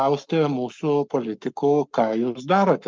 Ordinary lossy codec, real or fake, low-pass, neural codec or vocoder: Opus, 24 kbps; fake; 7.2 kHz; codec, 44.1 kHz, 7.8 kbps, Pupu-Codec